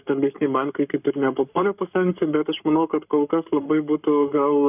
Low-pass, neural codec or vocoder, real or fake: 3.6 kHz; codec, 44.1 kHz, 7.8 kbps, Pupu-Codec; fake